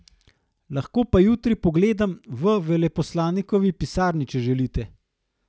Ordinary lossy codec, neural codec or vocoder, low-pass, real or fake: none; none; none; real